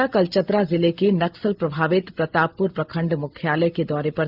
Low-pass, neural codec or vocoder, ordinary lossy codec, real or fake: 5.4 kHz; none; Opus, 24 kbps; real